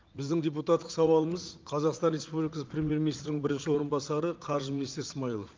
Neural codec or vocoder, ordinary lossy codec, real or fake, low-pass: vocoder, 44.1 kHz, 80 mel bands, Vocos; Opus, 24 kbps; fake; 7.2 kHz